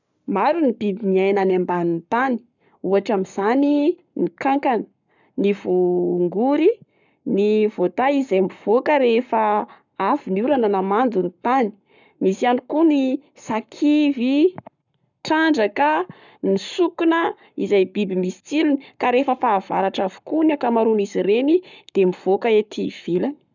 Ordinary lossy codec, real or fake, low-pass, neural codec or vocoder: none; fake; 7.2 kHz; codec, 44.1 kHz, 7.8 kbps, Pupu-Codec